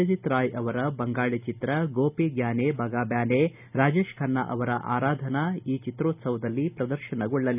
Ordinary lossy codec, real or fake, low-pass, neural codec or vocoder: none; real; 3.6 kHz; none